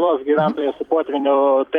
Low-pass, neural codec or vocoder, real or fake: 19.8 kHz; vocoder, 44.1 kHz, 128 mel bands every 256 samples, BigVGAN v2; fake